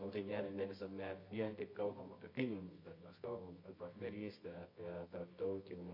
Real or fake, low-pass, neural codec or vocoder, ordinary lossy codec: fake; 5.4 kHz; codec, 24 kHz, 0.9 kbps, WavTokenizer, medium music audio release; MP3, 32 kbps